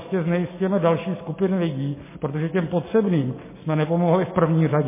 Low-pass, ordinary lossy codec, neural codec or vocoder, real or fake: 3.6 kHz; MP3, 16 kbps; none; real